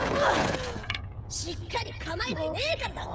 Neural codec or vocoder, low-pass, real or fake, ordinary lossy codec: codec, 16 kHz, 16 kbps, FreqCodec, smaller model; none; fake; none